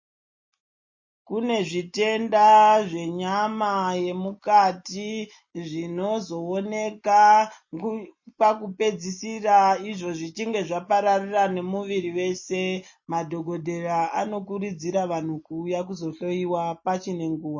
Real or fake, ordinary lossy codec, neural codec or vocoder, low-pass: real; MP3, 32 kbps; none; 7.2 kHz